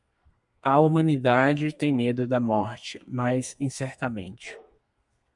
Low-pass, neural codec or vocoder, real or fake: 10.8 kHz; codec, 32 kHz, 1.9 kbps, SNAC; fake